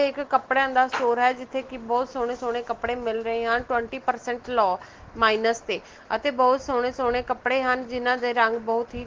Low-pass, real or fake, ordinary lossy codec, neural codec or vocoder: 7.2 kHz; real; Opus, 16 kbps; none